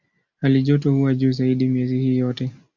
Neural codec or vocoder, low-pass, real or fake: none; 7.2 kHz; real